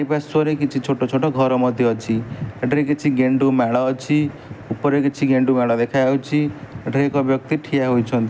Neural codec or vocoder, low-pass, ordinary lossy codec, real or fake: none; none; none; real